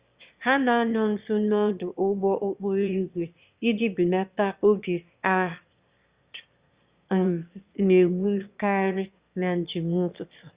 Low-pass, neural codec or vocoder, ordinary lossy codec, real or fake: 3.6 kHz; autoencoder, 22.05 kHz, a latent of 192 numbers a frame, VITS, trained on one speaker; Opus, 64 kbps; fake